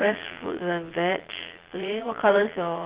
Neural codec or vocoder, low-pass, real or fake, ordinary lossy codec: vocoder, 22.05 kHz, 80 mel bands, Vocos; 3.6 kHz; fake; Opus, 16 kbps